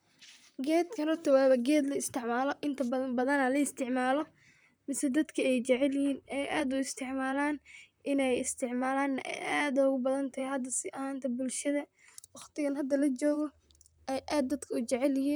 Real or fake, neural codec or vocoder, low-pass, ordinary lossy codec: fake; vocoder, 44.1 kHz, 128 mel bands, Pupu-Vocoder; none; none